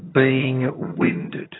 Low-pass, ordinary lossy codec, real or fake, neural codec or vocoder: 7.2 kHz; AAC, 16 kbps; fake; vocoder, 22.05 kHz, 80 mel bands, HiFi-GAN